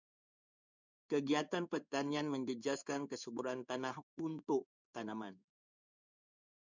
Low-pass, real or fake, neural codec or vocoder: 7.2 kHz; fake; codec, 16 kHz in and 24 kHz out, 1 kbps, XY-Tokenizer